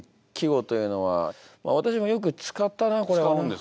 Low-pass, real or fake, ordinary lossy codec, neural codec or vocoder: none; real; none; none